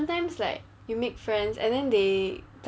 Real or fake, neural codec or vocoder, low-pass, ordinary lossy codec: real; none; none; none